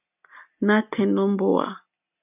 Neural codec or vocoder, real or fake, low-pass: none; real; 3.6 kHz